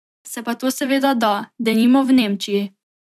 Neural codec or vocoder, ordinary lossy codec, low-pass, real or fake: vocoder, 44.1 kHz, 128 mel bands every 256 samples, BigVGAN v2; none; 14.4 kHz; fake